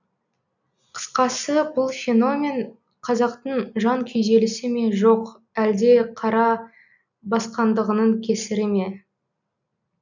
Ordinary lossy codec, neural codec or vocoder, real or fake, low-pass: none; none; real; 7.2 kHz